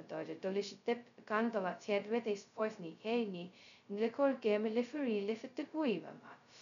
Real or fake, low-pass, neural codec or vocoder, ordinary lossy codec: fake; 7.2 kHz; codec, 16 kHz, 0.2 kbps, FocalCodec; none